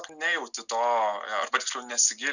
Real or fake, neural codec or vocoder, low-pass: real; none; 7.2 kHz